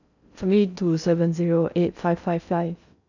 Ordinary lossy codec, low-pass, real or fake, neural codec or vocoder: none; 7.2 kHz; fake; codec, 16 kHz in and 24 kHz out, 0.6 kbps, FocalCodec, streaming, 4096 codes